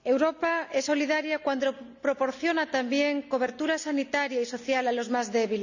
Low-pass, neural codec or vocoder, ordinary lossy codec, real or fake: 7.2 kHz; none; none; real